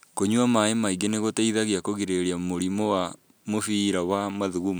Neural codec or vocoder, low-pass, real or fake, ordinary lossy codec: none; none; real; none